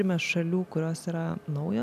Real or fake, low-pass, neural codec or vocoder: real; 14.4 kHz; none